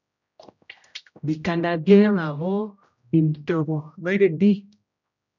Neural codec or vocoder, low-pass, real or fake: codec, 16 kHz, 0.5 kbps, X-Codec, HuBERT features, trained on general audio; 7.2 kHz; fake